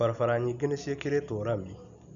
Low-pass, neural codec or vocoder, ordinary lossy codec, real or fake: 7.2 kHz; none; none; real